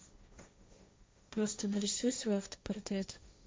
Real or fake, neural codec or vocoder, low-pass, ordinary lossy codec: fake; codec, 16 kHz, 1.1 kbps, Voila-Tokenizer; none; none